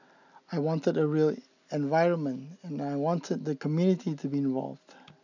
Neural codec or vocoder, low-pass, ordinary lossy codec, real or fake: none; 7.2 kHz; none; real